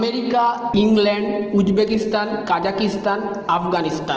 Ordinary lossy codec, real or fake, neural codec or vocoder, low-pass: Opus, 16 kbps; real; none; 7.2 kHz